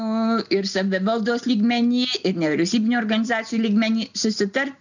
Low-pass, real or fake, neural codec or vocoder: 7.2 kHz; real; none